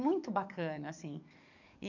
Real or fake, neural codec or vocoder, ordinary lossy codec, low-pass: fake; codec, 44.1 kHz, 7.8 kbps, DAC; none; 7.2 kHz